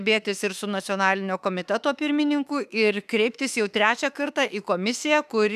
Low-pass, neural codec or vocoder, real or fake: 14.4 kHz; autoencoder, 48 kHz, 32 numbers a frame, DAC-VAE, trained on Japanese speech; fake